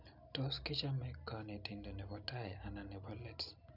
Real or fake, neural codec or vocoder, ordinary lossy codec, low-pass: real; none; none; 5.4 kHz